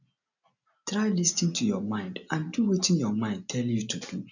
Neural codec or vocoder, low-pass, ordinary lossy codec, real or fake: none; 7.2 kHz; none; real